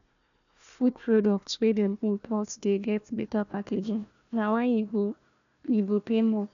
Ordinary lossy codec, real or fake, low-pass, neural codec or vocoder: none; fake; 7.2 kHz; codec, 16 kHz, 1 kbps, FunCodec, trained on Chinese and English, 50 frames a second